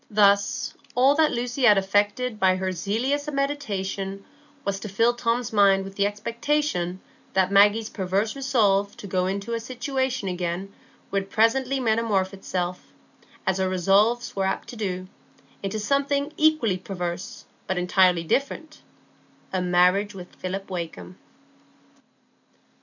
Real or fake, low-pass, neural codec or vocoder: real; 7.2 kHz; none